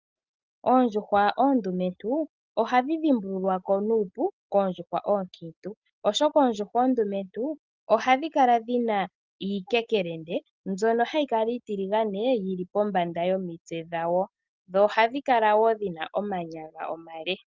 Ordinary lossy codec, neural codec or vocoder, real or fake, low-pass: Opus, 32 kbps; none; real; 7.2 kHz